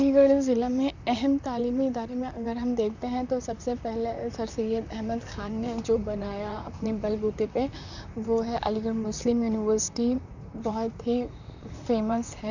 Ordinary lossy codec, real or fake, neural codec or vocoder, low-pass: none; fake; vocoder, 44.1 kHz, 128 mel bands, Pupu-Vocoder; 7.2 kHz